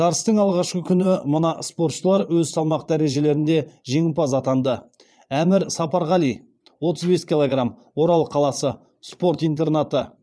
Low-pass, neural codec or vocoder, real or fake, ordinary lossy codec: none; vocoder, 22.05 kHz, 80 mel bands, Vocos; fake; none